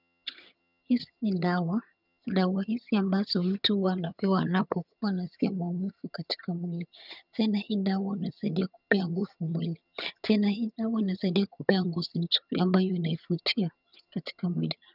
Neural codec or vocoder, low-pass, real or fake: vocoder, 22.05 kHz, 80 mel bands, HiFi-GAN; 5.4 kHz; fake